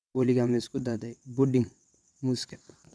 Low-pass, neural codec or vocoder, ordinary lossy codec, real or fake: none; vocoder, 22.05 kHz, 80 mel bands, WaveNeXt; none; fake